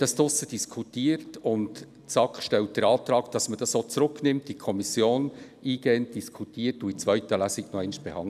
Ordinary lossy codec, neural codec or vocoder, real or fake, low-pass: none; none; real; 14.4 kHz